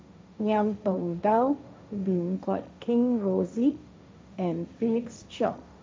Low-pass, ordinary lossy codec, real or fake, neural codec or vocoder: none; none; fake; codec, 16 kHz, 1.1 kbps, Voila-Tokenizer